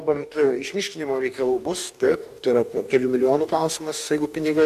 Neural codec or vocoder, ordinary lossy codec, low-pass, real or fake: codec, 44.1 kHz, 2.6 kbps, DAC; MP3, 96 kbps; 14.4 kHz; fake